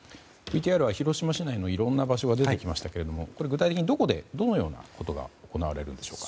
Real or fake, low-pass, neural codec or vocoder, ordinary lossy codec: real; none; none; none